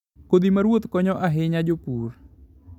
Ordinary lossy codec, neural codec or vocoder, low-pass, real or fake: none; none; 19.8 kHz; real